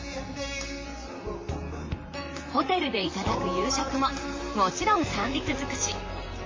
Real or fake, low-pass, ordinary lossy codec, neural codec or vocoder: fake; 7.2 kHz; MP3, 32 kbps; vocoder, 44.1 kHz, 128 mel bands, Pupu-Vocoder